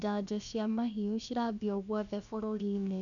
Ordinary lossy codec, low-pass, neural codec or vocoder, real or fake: none; 7.2 kHz; codec, 16 kHz, about 1 kbps, DyCAST, with the encoder's durations; fake